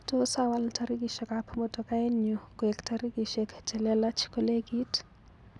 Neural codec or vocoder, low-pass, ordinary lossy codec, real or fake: none; none; none; real